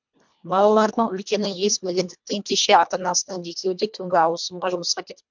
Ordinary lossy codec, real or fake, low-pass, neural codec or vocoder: MP3, 64 kbps; fake; 7.2 kHz; codec, 24 kHz, 1.5 kbps, HILCodec